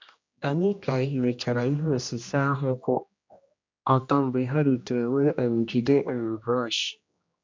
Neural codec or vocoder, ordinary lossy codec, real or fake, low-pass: codec, 16 kHz, 1 kbps, X-Codec, HuBERT features, trained on general audio; MP3, 64 kbps; fake; 7.2 kHz